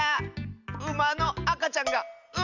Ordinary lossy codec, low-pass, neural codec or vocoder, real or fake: none; 7.2 kHz; none; real